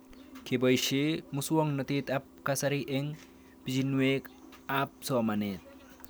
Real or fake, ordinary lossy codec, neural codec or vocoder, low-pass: real; none; none; none